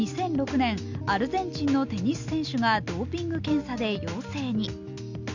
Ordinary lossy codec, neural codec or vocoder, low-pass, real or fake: none; none; 7.2 kHz; real